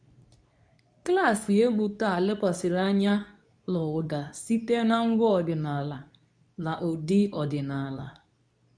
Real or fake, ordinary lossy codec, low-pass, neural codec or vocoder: fake; none; 9.9 kHz; codec, 24 kHz, 0.9 kbps, WavTokenizer, medium speech release version 2